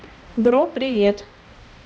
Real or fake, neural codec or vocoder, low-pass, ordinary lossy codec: fake; codec, 16 kHz, 1 kbps, X-Codec, HuBERT features, trained on balanced general audio; none; none